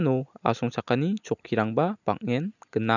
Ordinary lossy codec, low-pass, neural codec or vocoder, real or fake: none; 7.2 kHz; none; real